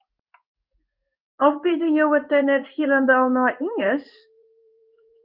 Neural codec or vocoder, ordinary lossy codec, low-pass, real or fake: codec, 16 kHz in and 24 kHz out, 1 kbps, XY-Tokenizer; Opus, 24 kbps; 5.4 kHz; fake